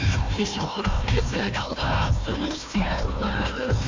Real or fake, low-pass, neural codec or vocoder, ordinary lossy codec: fake; 7.2 kHz; codec, 16 kHz, 1 kbps, FunCodec, trained on Chinese and English, 50 frames a second; MP3, 48 kbps